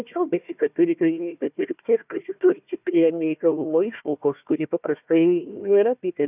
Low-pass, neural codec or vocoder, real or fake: 3.6 kHz; codec, 16 kHz, 1 kbps, FunCodec, trained on Chinese and English, 50 frames a second; fake